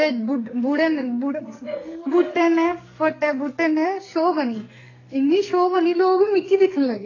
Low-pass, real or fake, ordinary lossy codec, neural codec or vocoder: 7.2 kHz; fake; AAC, 32 kbps; codec, 44.1 kHz, 2.6 kbps, SNAC